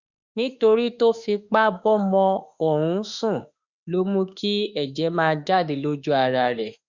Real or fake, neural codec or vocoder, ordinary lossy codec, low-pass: fake; autoencoder, 48 kHz, 32 numbers a frame, DAC-VAE, trained on Japanese speech; Opus, 64 kbps; 7.2 kHz